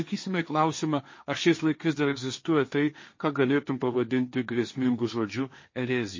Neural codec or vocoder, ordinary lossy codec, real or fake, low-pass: codec, 16 kHz, 1.1 kbps, Voila-Tokenizer; MP3, 32 kbps; fake; 7.2 kHz